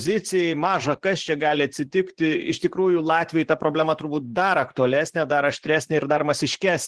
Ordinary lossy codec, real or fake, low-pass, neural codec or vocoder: Opus, 16 kbps; fake; 10.8 kHz; vocoder, 24 kHz, 100 mel bands, Vocos